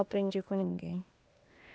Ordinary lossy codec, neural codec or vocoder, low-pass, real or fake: none; codec, 16 kHz, 0.8 kbps, ZipCodec; none; fake